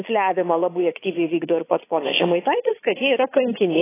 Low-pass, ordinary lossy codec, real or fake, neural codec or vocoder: 3.6 kHz; AAC, 16 kbps; fake; vocoder, 22.05 kHz, 80 mel bands, Vocos